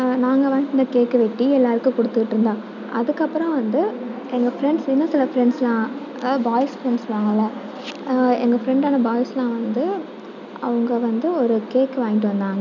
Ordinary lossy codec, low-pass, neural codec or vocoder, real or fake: none; 7.2 kHz; none; real